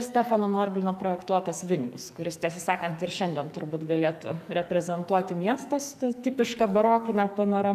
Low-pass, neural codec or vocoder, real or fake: 14.4 kHz; codec, 44.1 kHz, 2.6 kbps, SNAC; fake